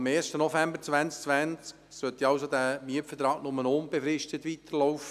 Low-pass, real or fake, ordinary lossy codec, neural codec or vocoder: 14.4 kHz; real; none; none